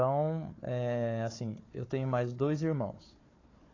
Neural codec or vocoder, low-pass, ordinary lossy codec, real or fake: codec, 16 kHz, 4 kbps, FunCodec, trained on Chinese and English, 50 frames a second; 7.2 kHz; AAC, 32 kbps; fake